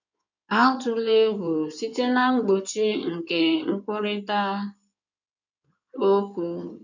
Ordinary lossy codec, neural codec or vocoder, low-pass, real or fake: none; codec, 16 kHz in and 24 kHz out, 2.2 kbps, FireRedTTS-2 codec; 7.2 kHz; fake